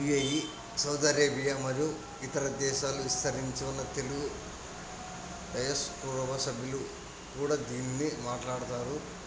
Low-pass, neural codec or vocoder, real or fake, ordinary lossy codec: none; none; real; none